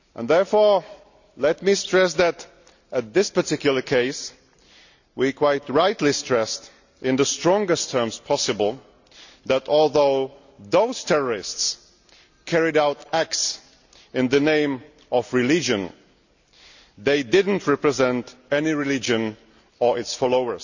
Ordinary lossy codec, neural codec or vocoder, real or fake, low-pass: none; none; real; 7.2 kHz